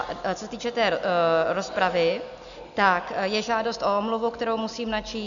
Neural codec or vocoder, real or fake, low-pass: none; real; 7.2 kHz